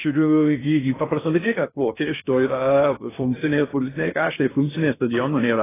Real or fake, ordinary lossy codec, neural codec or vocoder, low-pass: fake; AAC, 16 kbps; codec, 16 kHz in and 24 kHz out, 0.6 kbps, FocalCodec, streaming, 4096 codes; 3.6 kHz